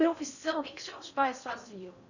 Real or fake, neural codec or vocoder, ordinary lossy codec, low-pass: fake; codec, 16 kHz in and 24 kHz out, 0.6 kbps, FocalCodec, streaming, 4096 codes; none; 7.2 kHz